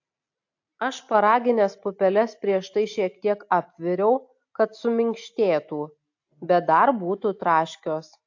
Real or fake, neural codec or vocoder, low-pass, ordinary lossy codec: real; none; 7.2 kHz; AAC, 48 kbps